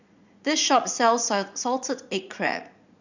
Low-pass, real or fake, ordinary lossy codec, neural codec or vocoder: 7.2 kHz; fake; none; vocoder, 44.1 kHz, 80 mel bands, Vocos